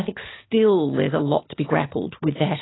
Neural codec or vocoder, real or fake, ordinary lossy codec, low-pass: none; real; AAC, 16 kbps; 7.2 kHz